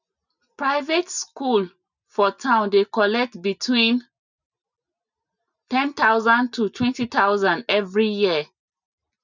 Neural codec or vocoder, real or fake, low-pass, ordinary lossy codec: none; real; 7.2 kHz; none